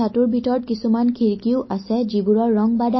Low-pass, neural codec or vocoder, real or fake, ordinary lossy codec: 7.2 kHz; none; real; MP3, 24 kbps